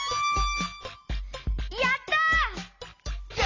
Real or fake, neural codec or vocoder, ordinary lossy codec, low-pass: real; none; AAC, 32 kbps; 7.2 kHz